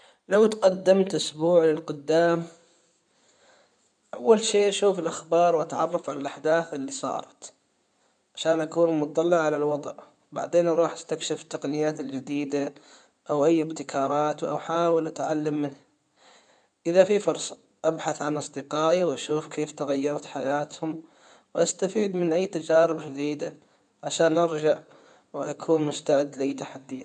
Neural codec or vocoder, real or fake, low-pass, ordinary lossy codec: codec, 16 kHz in and 24 kHz out, 2.2 kbps, FireRedTTS-2 codec; fake; 9.9 kHz; none